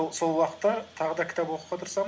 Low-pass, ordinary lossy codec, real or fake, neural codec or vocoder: none; none; real; none